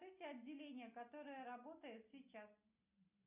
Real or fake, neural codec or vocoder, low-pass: real; none; 3.6 kHz